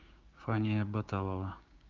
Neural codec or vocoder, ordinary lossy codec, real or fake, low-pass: codec, 16 kHz, 6 kbps, DAC; Opus, 32 kbps; fake; 7.2 kHz